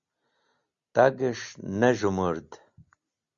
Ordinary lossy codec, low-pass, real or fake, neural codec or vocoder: Opus, 64 kbps; 7.2 kHz; real; none